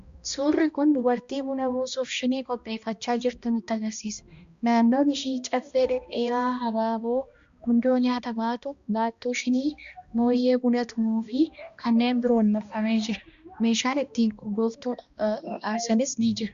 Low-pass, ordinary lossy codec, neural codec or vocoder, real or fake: 7.2 kHz; Opus, 64 kbps; codec, 16 kHz, 1 kbps, X-Codec, HuBERT features, trained on balanced general audio; fake